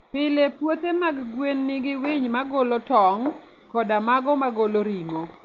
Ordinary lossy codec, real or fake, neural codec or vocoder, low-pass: Opus, 32 kbps; real; none; 7.2 kHz